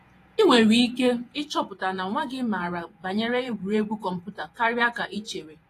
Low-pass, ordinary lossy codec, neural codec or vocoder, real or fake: 14.4 kHz; AAC, 48 kbps; vocoder, 48 kHz, 128 mel bands, Vocos; fake